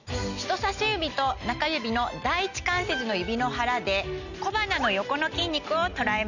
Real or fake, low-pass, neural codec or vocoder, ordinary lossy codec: real; 7.2 kHz; none; none